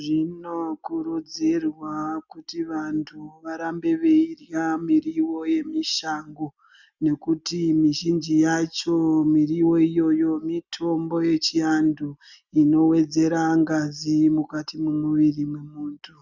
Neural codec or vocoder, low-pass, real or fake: none; 7.2 kHz; real